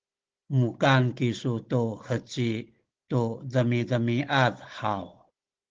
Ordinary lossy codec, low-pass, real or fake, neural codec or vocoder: Opus, 16 kbps; 7.2 kHz; fake; codec, 16 kHz, 16 kbps, FunCodec, trained on Chinese and English, 50 frames a second